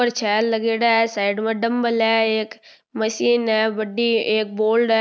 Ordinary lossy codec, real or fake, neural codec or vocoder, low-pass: none; real; none; none